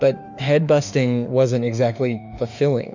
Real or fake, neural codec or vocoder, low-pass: fake; autoencoder, 48 kHz, 32 numbers a frame, DAC-VAE, trained on Japanese speech; 7.2 kHz